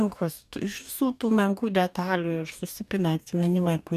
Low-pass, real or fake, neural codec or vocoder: 14.4 kHz; fake; codec, 44.1 kHz, 2.6 kbps, DAC